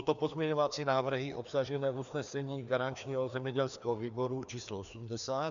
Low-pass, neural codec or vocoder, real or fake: 7.2 kHz; codec, 16 kHz, 2 kbps, FreqCodec, larger model; fake